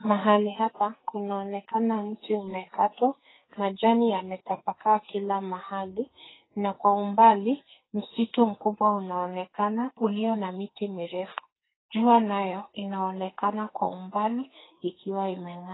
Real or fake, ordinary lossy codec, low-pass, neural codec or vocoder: fake; AAC, 16 kbps; 7.2 kHz; codec, 44.1 kHz, 2.6 kbps, SNAC